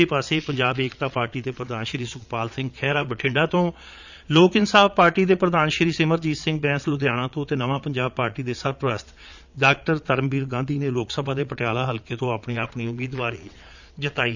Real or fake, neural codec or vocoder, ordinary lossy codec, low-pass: fake; vocoder, 22.05 kHz, 80 mel bands, Vocos; none; 7.2 kHz